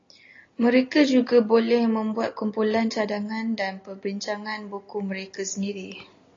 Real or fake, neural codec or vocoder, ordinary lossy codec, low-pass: real; none; AAC, 32 kbps; 7.2 kHz